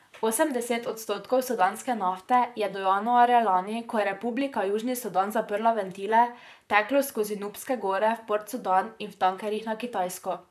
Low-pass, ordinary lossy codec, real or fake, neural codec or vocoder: 14.4 kHz; none; fake; vocoder, 44.1 kHz, 128 mel bands, Pupu-Vocoder